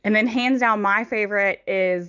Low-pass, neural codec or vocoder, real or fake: 7.2 kHz; none; real